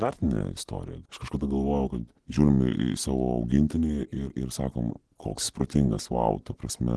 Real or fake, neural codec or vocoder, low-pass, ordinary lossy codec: real; none; 10.8 kHz; Opus, 16 kbps